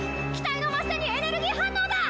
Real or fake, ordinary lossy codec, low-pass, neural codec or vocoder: real; none; none; none